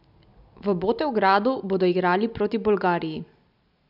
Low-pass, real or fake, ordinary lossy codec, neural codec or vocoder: 5.4 kHz; real; none; none